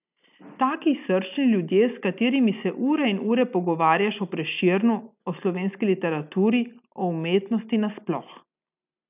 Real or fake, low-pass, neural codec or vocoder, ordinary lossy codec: real; 3.6 kHz; none; none